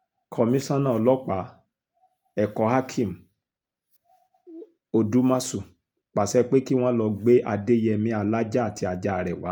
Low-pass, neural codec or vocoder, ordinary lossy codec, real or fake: none; none; none; real